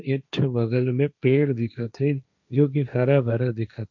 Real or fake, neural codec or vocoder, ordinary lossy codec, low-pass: fake; codec, 16 kHz, 1.1 kbps, Voila-Tokenizer; none; 7.2 kHz